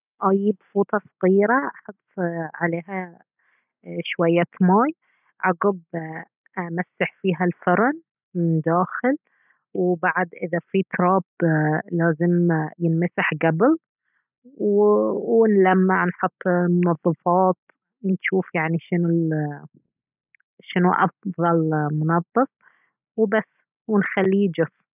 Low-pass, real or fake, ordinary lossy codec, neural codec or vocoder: 3.6 kHz; real; none; none